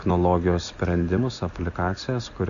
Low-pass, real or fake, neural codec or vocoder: 7.2 kHz; real; none